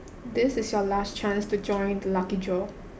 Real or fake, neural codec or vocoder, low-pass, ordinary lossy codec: real; none; none; none